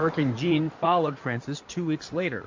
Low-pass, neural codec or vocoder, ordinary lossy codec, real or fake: 7.2 kHz; codec, 16 kHz in and 24 kHz out, 2.2 kbps, FireRedTTS-2 codec; MP3, 48 kbps; fake